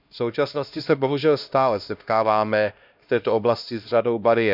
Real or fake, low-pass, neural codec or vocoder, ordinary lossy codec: fake; 5.4 kHz; codec, 16 kHz, 1 kbps, X-Codec, HuBERT features, trained on LibriSpeech; none